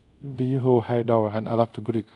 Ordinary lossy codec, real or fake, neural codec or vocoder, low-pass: none; fake; codec, 24 kHz, 0.5 kbps, DualCodec; 10.8 kHz